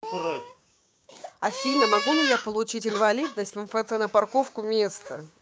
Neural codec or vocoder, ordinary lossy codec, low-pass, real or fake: codec, 16 kHz, 6 kbps, DAC; none; none; fake